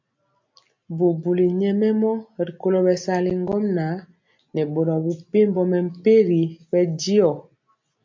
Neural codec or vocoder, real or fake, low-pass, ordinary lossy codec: none; real; 7.2 kHz; MP3, 48 kbps